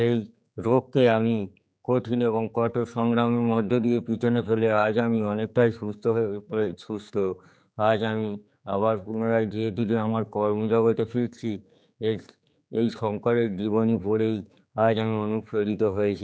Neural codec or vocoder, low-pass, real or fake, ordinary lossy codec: codec, 16 kHz, 4 kbps, X-Codec, HuBERT features, trained on general audio; none; fake; none